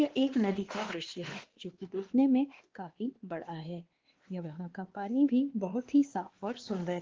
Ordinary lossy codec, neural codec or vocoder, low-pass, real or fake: Opus, 16 kbps; codec, 16 kHz, 2 kbps, X-Codec, WavLM features, trained on Multilingual LibriSpeech; 7.2 kHz; fake